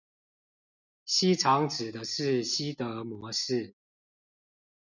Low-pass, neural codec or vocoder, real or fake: 7.2 kHz; none; real